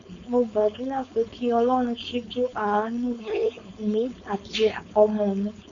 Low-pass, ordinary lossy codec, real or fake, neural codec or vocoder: 7.2 kHz; MP3, 64 kbps; fake; codec, 16 kHz, 4.8 kbps, FACodec